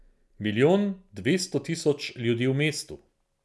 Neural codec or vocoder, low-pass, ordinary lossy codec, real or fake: none; none; none; real